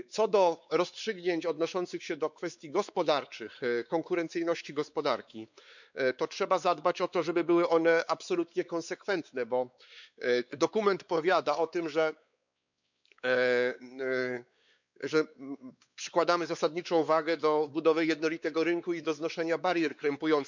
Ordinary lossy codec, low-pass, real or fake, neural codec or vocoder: none; 7.2 kHz; fake; codec, 16 kHz, 4 kbps, X-Codec, WavLM features, trained on Multilingual LibriSpeech